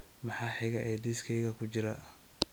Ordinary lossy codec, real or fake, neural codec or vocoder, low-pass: none; real; none; none